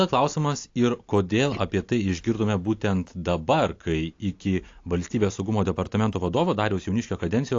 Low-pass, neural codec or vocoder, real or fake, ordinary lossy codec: 7.2 kHz; none; real; AAC, 48 kbps